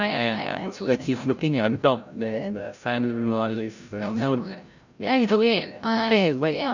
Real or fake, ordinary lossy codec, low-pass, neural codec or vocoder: fake; none; 7.2 kHz; codec, 16 kHz, 0.5 kbps, FreqCodec, larger model